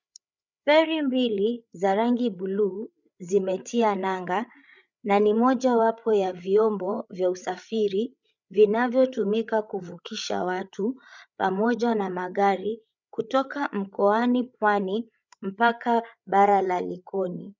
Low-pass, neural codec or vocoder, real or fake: 7.2 kHz; codec, 16 kHz, 8 kbps, FreqCodec, larger model; fake